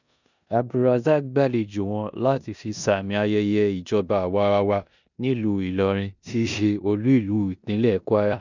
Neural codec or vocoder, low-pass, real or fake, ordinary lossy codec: codec, 16 kHz in and 24 kHz out, 0.9 kbps, LongCat-Audio-Codec, four codebook decoder; 7.2 kHz; fake; none